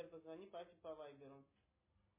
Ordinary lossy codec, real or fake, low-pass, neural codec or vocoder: MP3, 16 kbps; real; 3.6 kHz; none